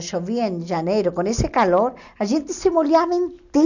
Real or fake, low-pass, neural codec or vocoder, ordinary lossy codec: real; 7.2 kHz; none; none